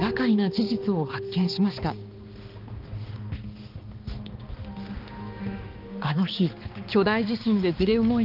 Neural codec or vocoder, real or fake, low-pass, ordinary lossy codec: codec, 16 kHz, 2 kbps, X-Codec, HuBERT features, trained on balanced general audio; fake; 5.4 kHz; Opus, 32 kbps